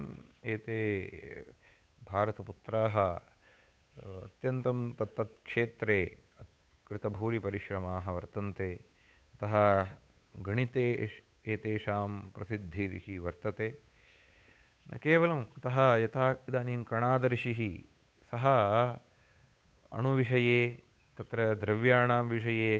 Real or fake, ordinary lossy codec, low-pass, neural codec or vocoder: fake; none; none; codec, 16 kHz, 8 kbps, FunCodec, trained on Chinese and English, 25 frames a second